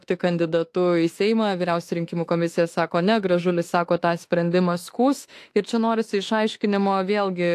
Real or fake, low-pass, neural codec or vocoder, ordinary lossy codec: fake; 14.4 kHz; autoencoder, 48 kHz, 32 numbers a frame, DAC-VAE, trained on Japanese speech; AAC, 64 kbps